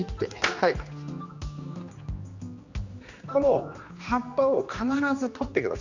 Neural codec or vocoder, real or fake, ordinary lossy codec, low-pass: codec, 16 kHz, 2 kbps, X-Codec, HuBERT features, trained on general audio; fake; Opus, 64 kbps; 7.2 kHz